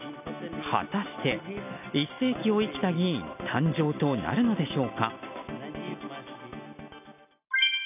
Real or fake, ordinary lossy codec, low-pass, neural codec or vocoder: real; none; 3.6 kHz; none